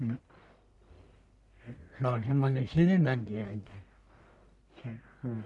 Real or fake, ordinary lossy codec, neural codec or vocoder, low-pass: fake; none; codec, 44.1 kHz, 1.7 kbps, Pupu-Codec; 10.8 kHz